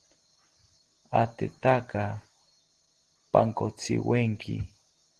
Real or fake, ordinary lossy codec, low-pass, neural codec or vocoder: real; Opus, 16 kbps; 10.8 kHz; none